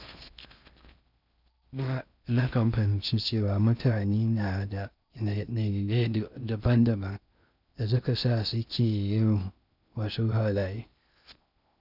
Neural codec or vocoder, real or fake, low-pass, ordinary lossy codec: codec, 16 kHz in and 24 kHz out, 0.6 kbps, FocalCodec, streaming, 4096 codes; fake; 5.4 kHz; none